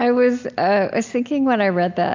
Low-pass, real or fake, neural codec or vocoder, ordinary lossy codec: 7.2 kHz; real; none; MP3, 64 kbps